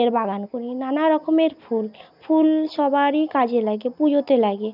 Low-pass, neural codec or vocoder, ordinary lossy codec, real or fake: 5.4 kHz; none; none; real